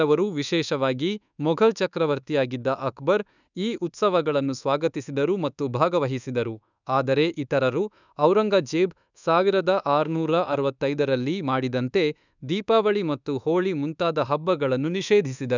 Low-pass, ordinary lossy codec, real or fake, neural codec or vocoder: 7.2 kHz; none; fake; codec, 24 kHz, 1.2 kbps, DualCodec